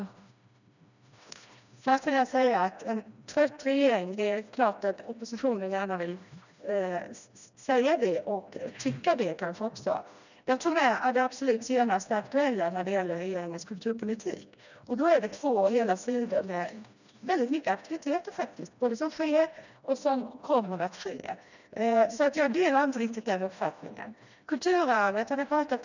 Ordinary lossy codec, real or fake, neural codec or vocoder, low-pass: none; fake; codec, 16 kHz, 1 kbps, FreqCodec, smaller model; 7.2 kHz